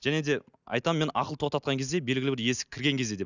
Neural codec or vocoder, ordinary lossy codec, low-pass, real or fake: none; none; 7.2 kHz; real